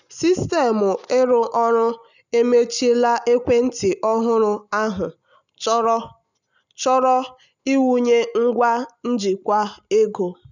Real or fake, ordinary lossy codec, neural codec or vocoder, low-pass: real; none; none; 7.2 kHz